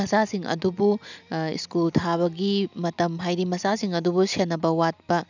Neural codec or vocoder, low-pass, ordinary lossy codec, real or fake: none; 7.2 kHz; none; real